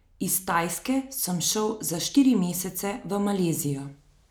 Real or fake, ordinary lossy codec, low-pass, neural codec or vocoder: real; none; none; none